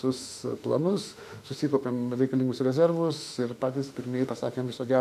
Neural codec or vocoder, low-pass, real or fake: autoencoder, 48 kHz, 32 numbers a frame, DAC-VAE, trained on Japanese speech; 14.4 kHz; fake